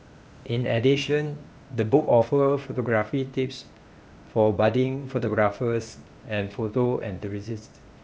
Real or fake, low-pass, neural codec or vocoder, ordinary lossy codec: fake; none; codec, 16 kHz, 0.8 kbps, ZipCodec; none